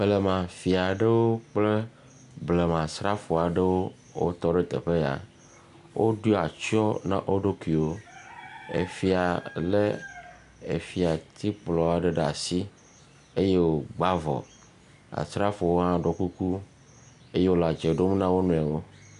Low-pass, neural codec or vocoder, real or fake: 10.8 kHz; none; real